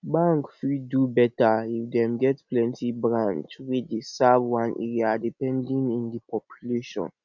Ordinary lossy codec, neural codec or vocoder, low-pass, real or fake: none; none; 7.2 kHz; real